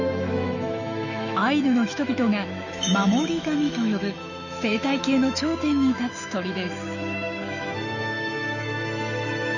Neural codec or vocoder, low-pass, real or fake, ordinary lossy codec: autoencoder, 48 kHz, 128 numbers a frame, DAC-VAE, trained on Japanese speech; 7.2 kHz; fake; none